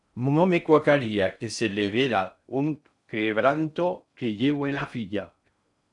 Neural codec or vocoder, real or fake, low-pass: codec, 16 kHz in and 24 kHz out, 0.6 kbps, FocalCodec, streaming, 4096 codes; fake; 10.8 kHz